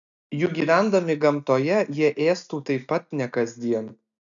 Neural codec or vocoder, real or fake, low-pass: none; real; 7.2 kHz